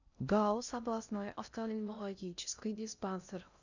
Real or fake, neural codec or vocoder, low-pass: fake; codec, 16 kHz in and 24 kHz out, 0.6 kbps, FocalCodec, streaming, 2048 codes; 7.2 kHz